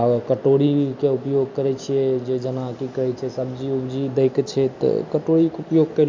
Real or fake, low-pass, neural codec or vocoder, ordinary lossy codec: real; 7.2 kHz; none; MP3, 64 kbps